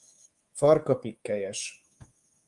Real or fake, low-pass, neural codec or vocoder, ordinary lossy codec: fake; 10.8 kHz; codec, 24 kHz, 3.1 kbps, DualCodec; Opus, 32 kbps